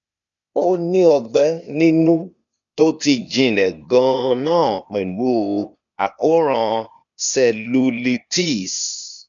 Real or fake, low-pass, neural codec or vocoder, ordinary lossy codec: fake; 7.2 kHz; codec, 16 kHz, 0.8 kbps, ZipCodec; none